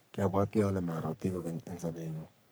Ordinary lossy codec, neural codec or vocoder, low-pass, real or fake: none; codec, 44.1 kHz, 3.4 kbps, Pupu-Codec; none; fake